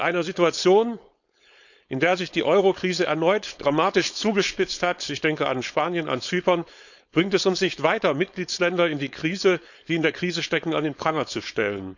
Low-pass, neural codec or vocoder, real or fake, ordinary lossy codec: 7.2 kHz; codec, 16 kHz, 4.8 kbps, FACodec; fake; none